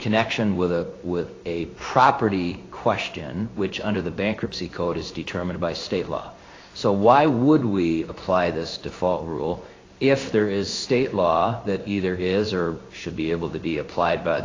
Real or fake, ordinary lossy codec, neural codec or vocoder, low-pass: fake; AAC, 32 kbps; codec, 16 kHz in and 24 kHz out, 1 kbps, XY-Tokenizer; 7.2 kHz